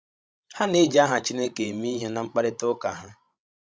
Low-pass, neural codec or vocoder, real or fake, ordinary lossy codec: none; codec, 16 kHz, 16 kbps, FreqCodec, larger model; fake; none